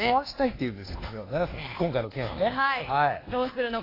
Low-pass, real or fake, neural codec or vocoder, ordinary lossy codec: 5.4 kHz; fake; codec, 24 kHz, 1.2 kbps, DualCodec; AAC, 24 kbps